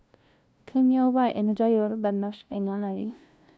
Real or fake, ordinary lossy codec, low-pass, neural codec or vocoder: fake; none; none; codec, 16 kHz, 0.5 kbps, FunCodec, trained on LibriTTS, 25 frames a second